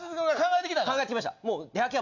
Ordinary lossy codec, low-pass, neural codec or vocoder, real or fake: none; 7.2 kHz; none; real